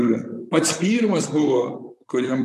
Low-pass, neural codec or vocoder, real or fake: 14.4 kHz; vocoder, 44.1 kHz, 128 mel bands, Pupu-Vocoder; fake